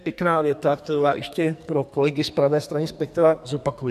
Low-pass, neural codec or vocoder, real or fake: 14.4 kHz; codec, 44.1 kHz, 2.6 kbps, SNAC; fake